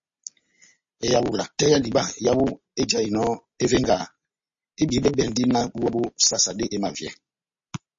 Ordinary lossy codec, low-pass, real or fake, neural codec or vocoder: MP3, 32 kbps; 7.2 kHz; real; none